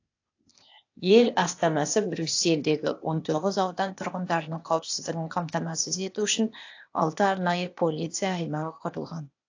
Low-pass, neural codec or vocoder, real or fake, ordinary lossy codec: 7.2 kHz; codec, 16 kHz, 0.8 kbps, ZipCodec; fake; AAC, 48 kbps